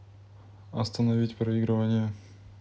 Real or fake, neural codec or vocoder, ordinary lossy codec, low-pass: real; none; none; none